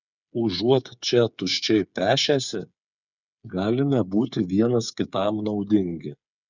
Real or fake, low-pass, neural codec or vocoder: fake; 7.2 kHz; codec, 16 kHz, 8 kbps, FreqCodec, smaller model